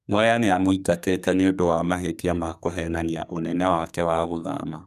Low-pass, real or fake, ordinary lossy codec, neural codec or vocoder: 14.4 kHz; fake; none; codec, 32 kHz, 1.9 kbps, SNAC